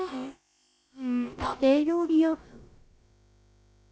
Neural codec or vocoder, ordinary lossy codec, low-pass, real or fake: codec, 16 kHz, about 1 kbps, DyCAST, with the encoder's durations; none; none; fake